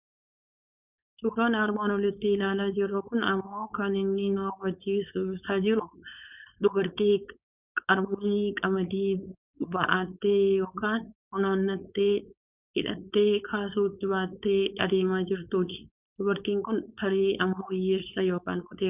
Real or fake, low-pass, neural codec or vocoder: fake; 3.6 kHz; codec, 16 kHz, 4.8 kbps, FACodec